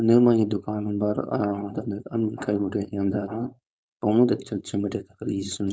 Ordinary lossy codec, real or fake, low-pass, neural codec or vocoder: none; fake; none; codec, 16 kHz, 4.8 kbps, FACodec